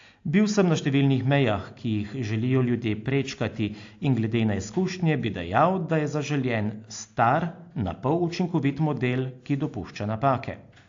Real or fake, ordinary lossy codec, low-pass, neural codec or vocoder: real; AAC, 48 kbps; 7.2 kHz; none